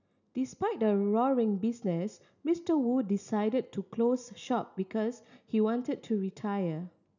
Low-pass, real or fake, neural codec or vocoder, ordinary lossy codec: 7.2 kHz; real; none; none